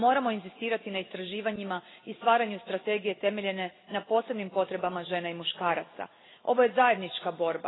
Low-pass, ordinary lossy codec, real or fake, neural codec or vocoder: 7.2 kHz; AAC, 16 kbps; real; none